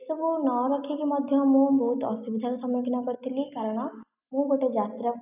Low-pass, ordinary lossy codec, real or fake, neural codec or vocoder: 3.6 kHz; none; real; none